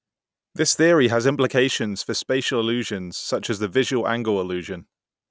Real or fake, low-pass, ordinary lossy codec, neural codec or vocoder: real; none; none; none